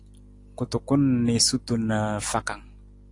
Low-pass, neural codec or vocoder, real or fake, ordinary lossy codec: 10.8 kHz; none; real; AAC, 48 kbps